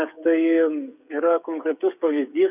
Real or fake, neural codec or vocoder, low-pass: fake; codec, 16 kHz, 8 kbps, FreqCodec, smaller model; 3.6 kHz